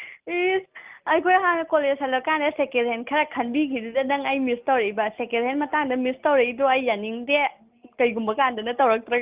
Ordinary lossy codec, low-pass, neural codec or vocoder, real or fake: Opus, 32 kbps; 3.6 kHz; none; real